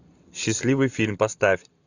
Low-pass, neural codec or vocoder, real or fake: 7.2 kHz; none; real